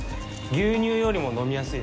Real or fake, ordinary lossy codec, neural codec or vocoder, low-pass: real; none; none; none